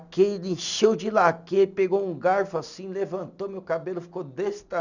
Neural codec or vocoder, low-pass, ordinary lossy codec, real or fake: none; 7.2 kHz; none; real